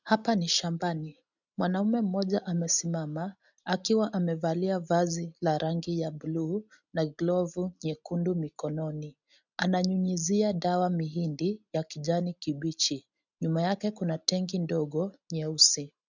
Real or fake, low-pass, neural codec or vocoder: real; 7.2 kHz; none